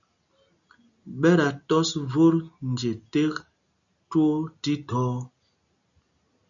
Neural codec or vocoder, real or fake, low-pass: none; real; 7.2 kHz